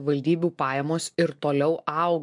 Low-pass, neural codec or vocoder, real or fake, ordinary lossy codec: 10.8 kHz; none; real; MP3, 64 kbps